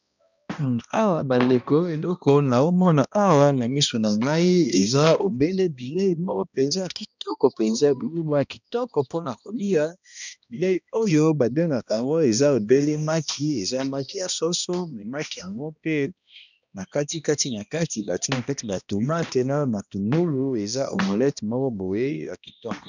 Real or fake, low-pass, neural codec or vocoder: fake; 7.2 kHz; codec, 16 kHz, 1 kbps, X-Codec, HuBERT features, trained on balanced general audio